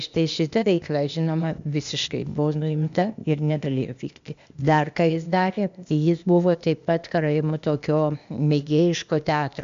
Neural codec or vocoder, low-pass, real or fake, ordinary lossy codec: codec, 16 kHz, 0.8 kbps, ZipCodec; 7.2 kHz; fake; MP3, 64 kbps